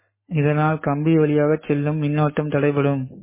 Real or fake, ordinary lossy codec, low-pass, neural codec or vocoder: fake; MP3, 16 kbps; 3.6 kHz; codec, 44.1 kHz, 7.8 kbps, Pupu-Codec